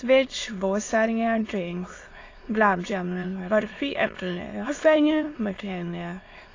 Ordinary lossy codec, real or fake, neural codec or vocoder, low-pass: AAC, 32 kbps; fake; autoencoder, 22.05 kHz, a latent of 192 numbers a frame, VITS, trained on many speakers; 7.2 kHz